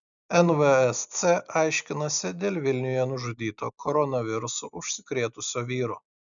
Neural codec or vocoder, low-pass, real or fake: none; 7.2 kHz; real